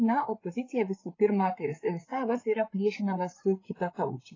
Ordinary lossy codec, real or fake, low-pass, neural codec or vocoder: AAC, 32 kbps; fake; 7.2 kHz; codec, 16 kHz, 8 kbps, FreqCodec, larger model